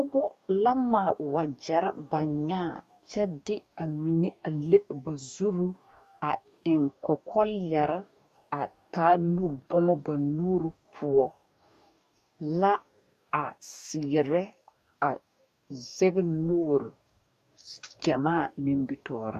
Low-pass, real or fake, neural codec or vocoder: 14.4 kHz; fake; codec, 44.1 kHz, 2.6 kbps, DAC